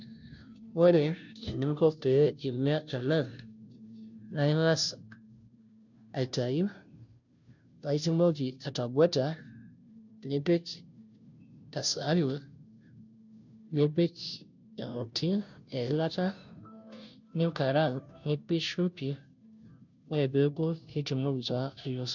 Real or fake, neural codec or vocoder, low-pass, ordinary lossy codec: fake; codec, 16 kHz, 0.5 kbps, FunCodec, trained on Chinese and English, 25 frames a second; 7.2 kHz; none